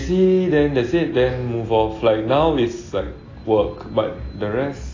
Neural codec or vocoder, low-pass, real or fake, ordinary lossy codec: none; 7.2 kHz; real; AAC, 32 kbps